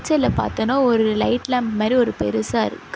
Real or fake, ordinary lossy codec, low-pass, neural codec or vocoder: real; none; none; none